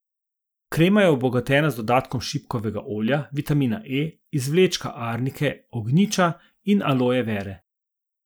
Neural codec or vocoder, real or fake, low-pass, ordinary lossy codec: none; real; none; none